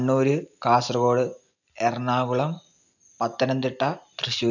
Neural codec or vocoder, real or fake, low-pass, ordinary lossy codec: none; real; 7.2 kHz; none